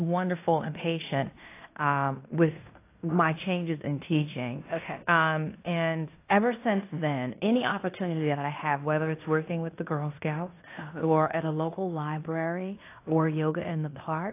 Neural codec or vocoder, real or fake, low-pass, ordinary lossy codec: codec, 16 kHz in and 24 kHz out, 0.9 kbps, LongCat-Audio-Codec, fine tuned four codebook decoder; fake; 3.6 kHz; AAC, 24 kbps